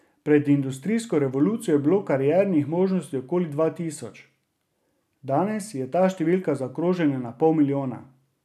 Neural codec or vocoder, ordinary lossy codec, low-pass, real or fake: none; none; 14.4 kHz; real